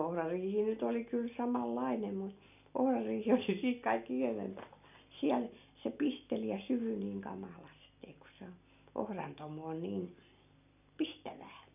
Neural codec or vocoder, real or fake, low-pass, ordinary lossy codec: none; real; 3.6 kHz; none